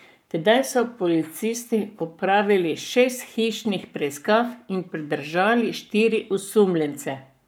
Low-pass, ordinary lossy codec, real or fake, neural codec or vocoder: none; none; fake; codec, 44.1 kHz, 7.8 kbps, Pupu-Codec